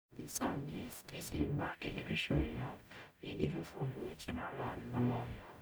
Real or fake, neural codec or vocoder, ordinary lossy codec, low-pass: fake; codec, 44.1 kHz, 0.9 kbps, DAC; none; none